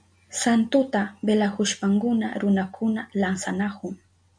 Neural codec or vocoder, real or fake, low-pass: none; real; 9.9 kHz